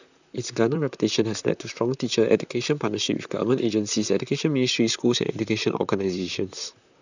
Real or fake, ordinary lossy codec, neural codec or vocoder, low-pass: fake; none; vocoder, 44.1 kHz, 128 mel bands, Pupu-Vocoder; 7.2 kHz